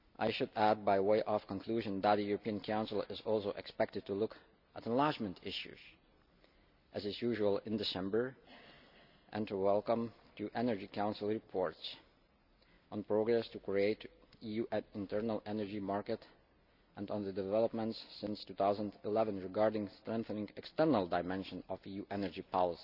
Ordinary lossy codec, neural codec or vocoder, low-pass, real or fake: none; none; 5.4 kHz; real